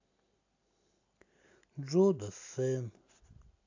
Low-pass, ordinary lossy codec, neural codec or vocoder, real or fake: 7.2 kHz; AAC, 48 kbps; none; real